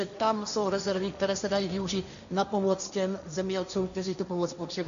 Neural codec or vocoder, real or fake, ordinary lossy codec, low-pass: codec, 16 kHz, 1.1 kbps, Voila-Tokenizer; fake; AAC, 96 kbps; 7.2 kHz